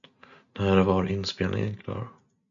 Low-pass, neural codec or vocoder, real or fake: 7.2 kHz; none; real